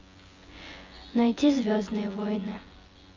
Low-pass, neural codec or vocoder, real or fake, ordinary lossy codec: 7.2 kHz; vocoder, 24 kHz, 100 mel bands, Vocos; fake; Opus, 32 kbps